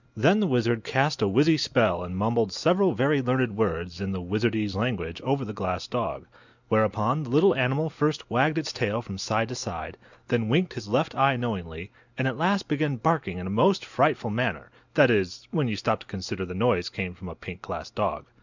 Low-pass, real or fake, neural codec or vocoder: 7.2 kHz; real; none